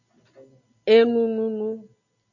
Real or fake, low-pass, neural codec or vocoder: real; 7.2 kHz; none